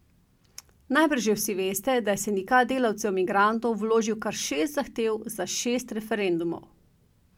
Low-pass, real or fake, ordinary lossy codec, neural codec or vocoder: 19.8 kHz; real; MP3, 96 kbps; none